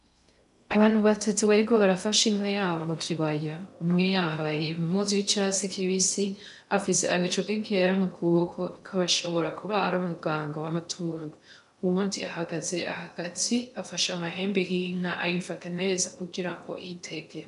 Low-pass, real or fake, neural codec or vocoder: 10.8 kHz; fake; codec, 16 kHz in and 24 kHz out, 0.6 kbps, FocalCodec, streaming, 2048 codes